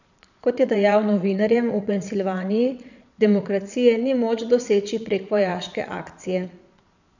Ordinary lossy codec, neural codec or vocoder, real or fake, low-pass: none; vocoder, 22.05 kHz, 80 mel bands, Vocos; fake; 7.2 kHz